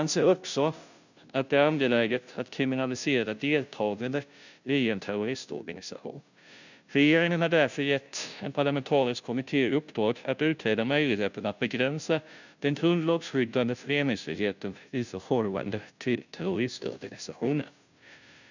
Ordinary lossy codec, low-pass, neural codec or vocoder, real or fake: none; 7.2 kHz; codec, 16 kHz, 0.5 kbps, FunCodec, trained on Chinese and English, 25 frames a second; fake